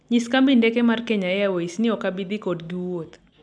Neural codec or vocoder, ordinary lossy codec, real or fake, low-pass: none; none; real; 9.9 kHz